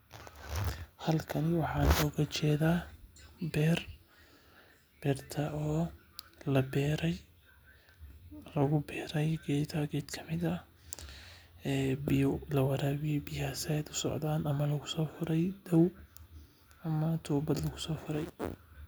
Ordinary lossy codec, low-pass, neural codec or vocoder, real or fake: none; none; none; real